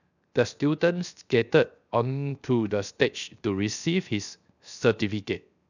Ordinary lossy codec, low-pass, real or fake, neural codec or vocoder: none; 7.2 kHz; fake; codec, 16 kHz, 0.7 kbps, FocalCodec